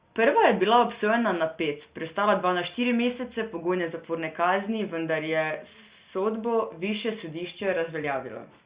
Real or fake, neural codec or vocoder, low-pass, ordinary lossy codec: real; none; 3.6 kHz; Opus, 64 kbps